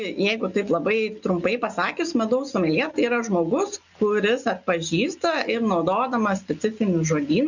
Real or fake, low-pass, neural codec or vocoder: real; 7.2 kHz; none